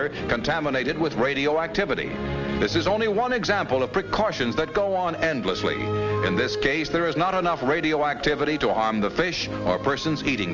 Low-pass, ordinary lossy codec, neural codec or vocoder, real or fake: 7.2 kHz; Opus, 32 kbps; none; real